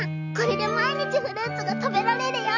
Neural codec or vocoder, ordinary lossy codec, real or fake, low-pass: none; none; real; 7.2 kHz